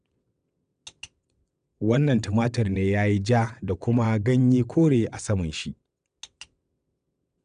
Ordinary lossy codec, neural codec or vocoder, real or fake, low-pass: none; vocoder, 22.05 kHz, 80 mel bands, WaveNeXt; fake; 9.9 kHz